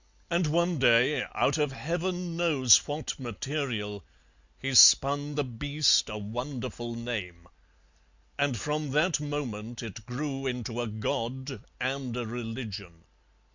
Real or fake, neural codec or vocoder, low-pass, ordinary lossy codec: real; none; 7.2 kHz; Opus, 64 kbps